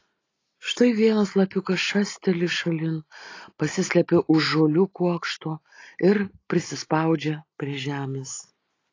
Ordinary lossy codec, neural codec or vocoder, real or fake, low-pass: AAC, 32 kbps; none; real; 7.2 kHz